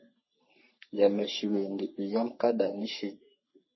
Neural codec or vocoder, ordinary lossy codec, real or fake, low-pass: codec, 44.1 kHz, 3.4 kbps, Pupu-Codec; MP3, 24 kbps; fake; 7.2 kHz